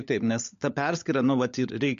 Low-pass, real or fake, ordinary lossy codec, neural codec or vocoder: 7.2 kHz; fake; MP3, 48 kbps; codec, 16 kHz, 16 kbps, FunCodec, trained on LibriTTS, 50 frames a second